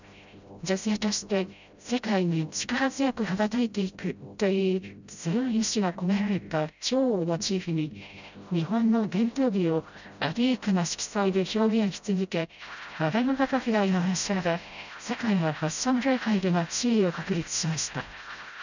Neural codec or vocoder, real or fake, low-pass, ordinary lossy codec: codec, 16 kHz, 0.5 kbps, FreqCodec, smaller model; fake; 7.2 kHz; none